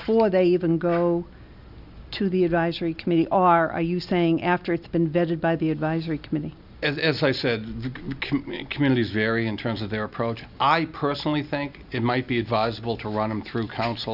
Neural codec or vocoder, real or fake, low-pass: none; real; 5.4 kHz